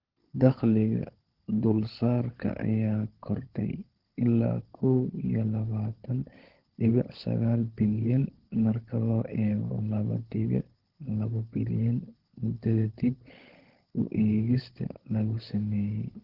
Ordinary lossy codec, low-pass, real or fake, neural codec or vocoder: Opus, 16 kbps; 5.4 kHz; fake; codec, 16 kHz, 16 kbps, FunCodec, trained on LibriTTS, 50 frames a second